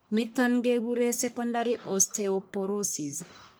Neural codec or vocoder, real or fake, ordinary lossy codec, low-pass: codec, 44.1 kHz, 1.7 kbps, Pupu-Codec; fake; none; none